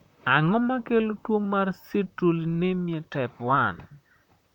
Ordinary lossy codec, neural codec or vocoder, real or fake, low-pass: none; vocoder, 44.1 kHz, 128 mel bands, Pupu-Vocoder; fake; 19.8 kHz